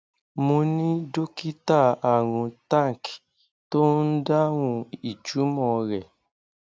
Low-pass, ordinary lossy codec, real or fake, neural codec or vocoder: none; none; real; none